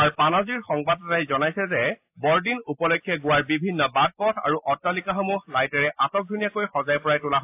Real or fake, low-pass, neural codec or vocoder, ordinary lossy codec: real; 3.6 kHz; none; AAC, 32 kbps